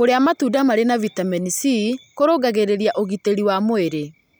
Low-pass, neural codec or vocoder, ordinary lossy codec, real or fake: none; none; none; real